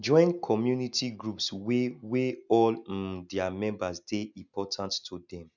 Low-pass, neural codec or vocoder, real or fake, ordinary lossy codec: 7.2 kHz; none; real; none